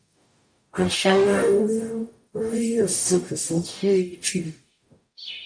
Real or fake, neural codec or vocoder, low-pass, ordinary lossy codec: fake; codec, 44.1 kHz, 0.9 kbps, DAC; 9.9 kHz; AAC, 64 kbps